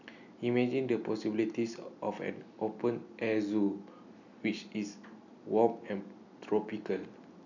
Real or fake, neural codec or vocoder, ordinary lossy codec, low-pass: real; none; none; 7.2 kHz